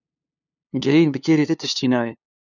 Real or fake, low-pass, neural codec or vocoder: fake; 7.2 kHz; codec, 16 kHz, 2 kbps, FunCodec, trained on LibriTTS, 25 frames a second